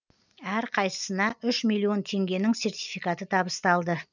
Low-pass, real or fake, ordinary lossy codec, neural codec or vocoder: 7.2 kHz; real; none; none